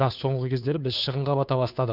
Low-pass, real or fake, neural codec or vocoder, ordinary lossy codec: 5.4 kHz; fake; codec, 16 kHz, 2 kbps, FunCodec, trained on Chinese and English, 25 frames a second; none